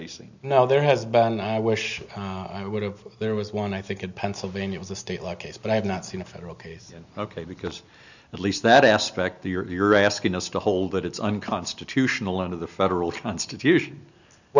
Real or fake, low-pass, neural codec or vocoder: real; 7.2 kHz; none